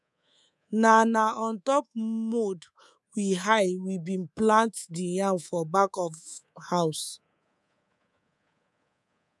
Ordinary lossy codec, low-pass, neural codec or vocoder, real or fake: none; none; codec, 24 kHz, 3.1 kbps, DualCodec; fake